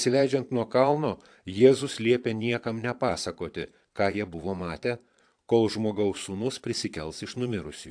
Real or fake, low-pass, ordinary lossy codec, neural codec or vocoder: fake; 9.9 kHz; AAC, 64 kbps; vocoder, 22.05 kHz, 80 mel bands, Vocos